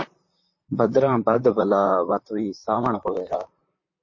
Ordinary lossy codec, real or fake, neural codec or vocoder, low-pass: MP3, 32 kbps; fake; vocoder, 44.1 kHz, 128 mel bands, Pupu-Vocoder; 7.2 kHz